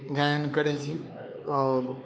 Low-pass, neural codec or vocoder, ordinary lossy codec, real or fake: none; codec, 16 kHz, 4 kbps, X-Codec, HuBERT features, trained on LibriSpeech; none; fake